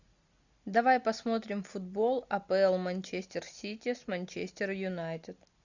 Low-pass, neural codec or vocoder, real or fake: 7.2 kHz; none; real